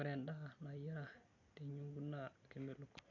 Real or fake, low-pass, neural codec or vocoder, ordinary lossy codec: real; 7.2 kHz; none; none